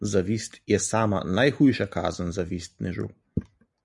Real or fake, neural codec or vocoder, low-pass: real; none; 10.8 kHz